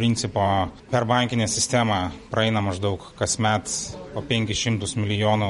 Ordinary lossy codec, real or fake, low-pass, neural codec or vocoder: MP3, 48 kbps; fake; 19.8 kHz; vocoder, 44.1 kHz, 128 mel bands every 512 samples, BigVGAN v2